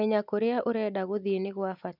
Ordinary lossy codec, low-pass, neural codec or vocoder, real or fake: none; 5.4 kHz; none; real